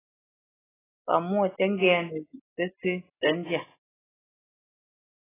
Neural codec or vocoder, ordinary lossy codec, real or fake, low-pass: none; AAC, 16 kbps; real; 3.6 kHz